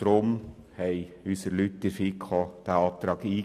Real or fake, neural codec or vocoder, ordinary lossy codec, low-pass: real; none; none; 14.4 kHz